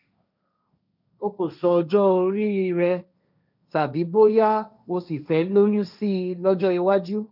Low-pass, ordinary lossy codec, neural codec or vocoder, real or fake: 5.4 kHz; none; codec, 16 kHz, 1.1 kbps, Voila-Tokenizer; fake